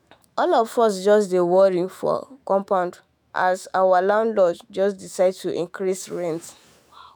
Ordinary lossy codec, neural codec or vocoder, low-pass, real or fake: none; autoencoder, 48 kHz, 128 numbers a frame, DAC-VAE, trained on Japanese speech; none; fake